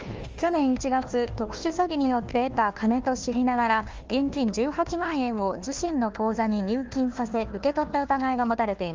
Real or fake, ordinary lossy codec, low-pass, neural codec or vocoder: fake; Opus, 24 kbps; 7.2 kHz; codec, 16 kHz, 1 kbps, FunCodec, trained on Chinese and English, 50 frames a second